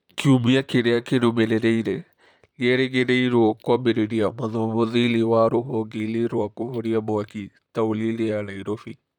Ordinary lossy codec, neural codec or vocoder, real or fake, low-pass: none; vocoder, 44.1 kHz, 128 mel bands, Pupu-Vocoder; fake; 19.8 kHz